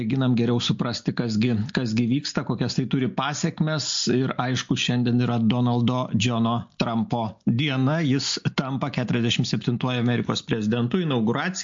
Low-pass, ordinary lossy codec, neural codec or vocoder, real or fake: 7.2 kHz; MP3, 48 kbps; none; real